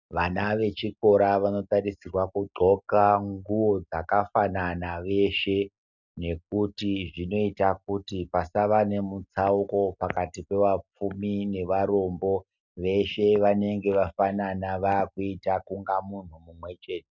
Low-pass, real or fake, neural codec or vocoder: 7.2 kHz; real; none